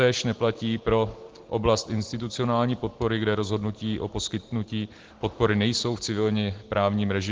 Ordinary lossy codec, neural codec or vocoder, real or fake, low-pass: Opus, 16 kbps; none; real; 7.2 kHz